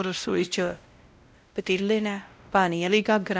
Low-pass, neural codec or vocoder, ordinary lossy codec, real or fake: none; codec, 16 kHz, 0.5 kbps, X-Codec, WavLM features, trained on Multilingual LibriSpeech; none; fake